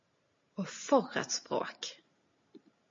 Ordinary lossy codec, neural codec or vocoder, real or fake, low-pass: MP3, 32 kbps; none; real; 7.2 kHz